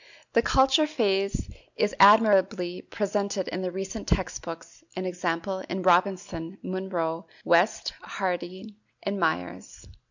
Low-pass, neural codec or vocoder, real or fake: 7.2 kHz; none; real